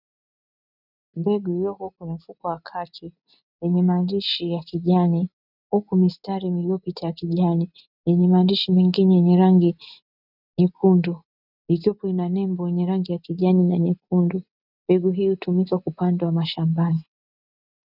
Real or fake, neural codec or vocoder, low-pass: real; none; 5.4 kHz